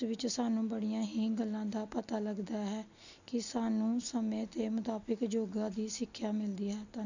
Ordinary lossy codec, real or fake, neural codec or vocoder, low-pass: none; real; none; 7.2 kHz